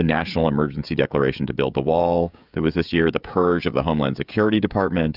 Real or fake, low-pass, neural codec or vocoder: fake; 5.4 kHz; codec, 16 kHz, 16 kbps, FreqCodec, smaller model